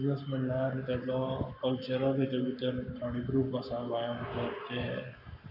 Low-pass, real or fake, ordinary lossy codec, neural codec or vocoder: 5.4 kHz; fake; none; codec, 44.1 kHz, 7.8 kbps, Pupu-Codec